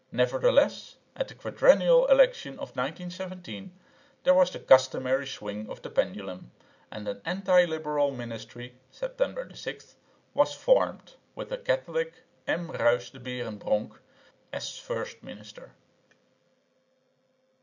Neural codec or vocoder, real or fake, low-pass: none; real; 7.2 kHz